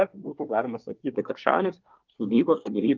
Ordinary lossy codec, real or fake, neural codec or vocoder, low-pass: Opus, 24 kbps; fake; codec, 24 kHz, 1 kbps, SNAC; 7.2 kHz